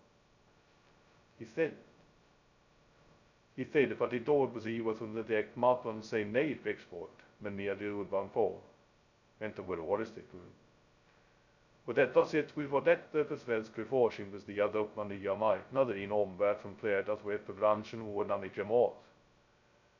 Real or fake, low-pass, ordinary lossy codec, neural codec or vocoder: fake; 7.2 kHz; none; codec, 16 kHz, 0.2 kbps, FocalCodec